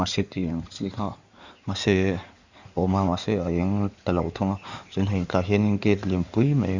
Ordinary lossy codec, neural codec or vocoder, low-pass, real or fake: Opus, 64 kbps; codec, 16 kHz in and 24 kHz out, 2.2 kbps, FireRedTTS-2 codec; 7.2 kHz; fake